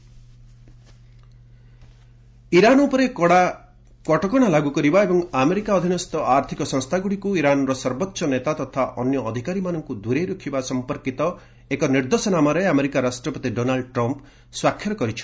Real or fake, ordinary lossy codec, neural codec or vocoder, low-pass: real; none; none; none